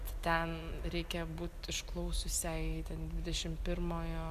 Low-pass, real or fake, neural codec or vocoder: 14.4 kHz; real; none